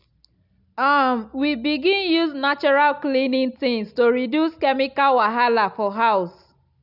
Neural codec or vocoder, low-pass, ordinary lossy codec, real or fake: none; 5.4 kHz; none; real